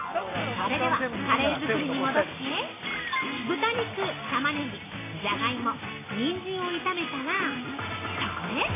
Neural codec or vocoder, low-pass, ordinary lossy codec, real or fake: none; 3.6 kHz; AAC, 16 kbps; real